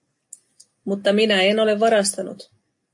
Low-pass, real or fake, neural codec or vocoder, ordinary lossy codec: 10.8 kHz; real; none; AAC, 64 kbps